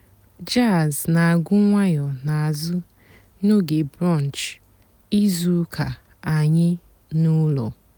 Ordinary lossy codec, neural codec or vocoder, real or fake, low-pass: none; none; real; none